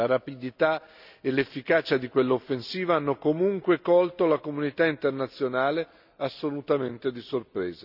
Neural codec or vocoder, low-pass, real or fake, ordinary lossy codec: none; 5.4 kHz; real; none